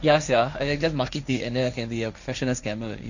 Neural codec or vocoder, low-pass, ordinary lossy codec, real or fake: codec, 16 kHz, 1.1 kbps, Voila-Tokenizer; 7.2 kHz; none; fake